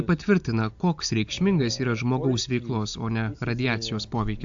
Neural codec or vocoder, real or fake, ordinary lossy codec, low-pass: none; real; AAC, 64 kbps; 7.2 kHz